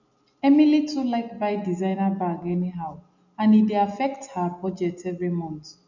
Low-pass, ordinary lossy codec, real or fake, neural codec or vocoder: 7.2 kHz; none; real; none